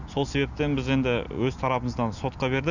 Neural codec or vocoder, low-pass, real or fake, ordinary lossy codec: none; 7.2 kHz; real; none